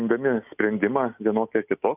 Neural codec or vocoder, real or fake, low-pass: none; real; 3.6 kHz